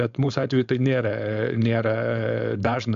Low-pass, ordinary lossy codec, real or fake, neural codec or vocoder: 7.2 kHz; AAC, 64 kbps; fake; codec, 16 kHz, 4.8 kbps, FACodec